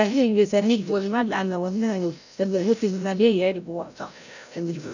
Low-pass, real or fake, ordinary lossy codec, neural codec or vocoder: 7.2 kHz; fake; none; codec, 16 kHz, 0.5 kbps, FreqCodec, larger model